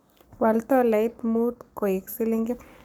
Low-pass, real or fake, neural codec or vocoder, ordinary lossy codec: none; fake; codec, 44.1 kHz, 7.8 kbps, Pupu-Codec; none